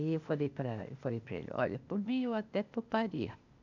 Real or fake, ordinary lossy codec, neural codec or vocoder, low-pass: fake; none; codec, 16 kHz, 0.8 kbps, ZipCodec; 7.2 kHz